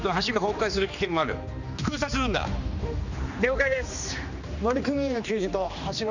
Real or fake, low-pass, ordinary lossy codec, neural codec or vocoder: fake; 7.2 kHz; none; codec, 16 kHz, 2 kbps, X-Codec, HuBERT features, trained on general audio